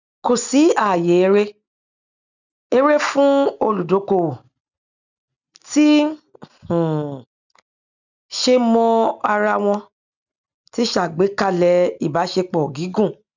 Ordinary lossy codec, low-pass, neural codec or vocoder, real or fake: none; 7.2 kHz; none; real